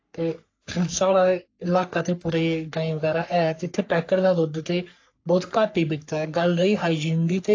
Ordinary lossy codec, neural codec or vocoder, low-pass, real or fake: AAC, 48 kbps; codec, 44.1 kHz, 3.4 kbps, Pupu-Codec; 7.2 kHz; fake